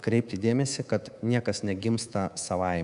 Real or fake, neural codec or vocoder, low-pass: fake; codec, 24 kHz, 3.1 kbps, DualCodec; 10.8 kHz